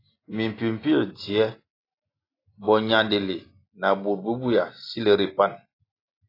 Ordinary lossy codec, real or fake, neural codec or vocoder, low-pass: AAC, 24 kbps; real; none; 5.4 kHz